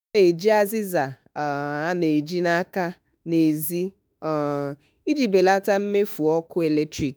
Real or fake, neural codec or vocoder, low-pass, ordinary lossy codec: fake; autoencoder, 48 kHz, 32 numbers a frame, DAC-VAE, trained on Japanese speech; none; none